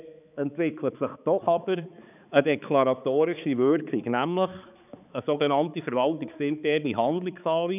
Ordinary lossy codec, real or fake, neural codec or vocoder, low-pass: none; fake; codec, 16 kHz, 4 kbps, X-Codec, HuBERT features, trained on balanced general audio; 3.6 kHz